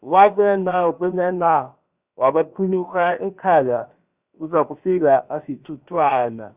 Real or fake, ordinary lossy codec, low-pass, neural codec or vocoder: fake; Opus, 64 kbps; 3.6 kHz; codec, 16 kHz, about 1 kbps, DyCAST, with the encoder's durations